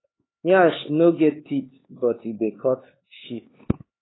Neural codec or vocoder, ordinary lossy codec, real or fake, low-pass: codec, 16 kHz, 4 kbps, X-Codec, HuBERT features, trained on LibriSpeech; AAC, 16 kbps; fake; 7.2 kHz